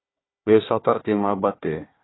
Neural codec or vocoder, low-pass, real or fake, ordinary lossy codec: codec, 16 kHz, 1 kbps, FunCodec, trained on Chinese and English, 50 frames a second; 7.2 kHz; fake; AAC, 16 kbps